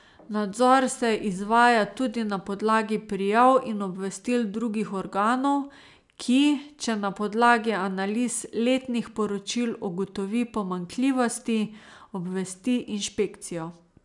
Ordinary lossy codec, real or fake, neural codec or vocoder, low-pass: none; real; none; 10.8 kHz